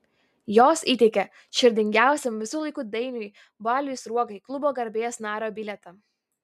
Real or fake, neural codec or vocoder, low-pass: real; none; 14.4 kHz